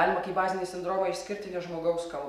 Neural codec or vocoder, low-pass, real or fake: none; 14.4 kHz; real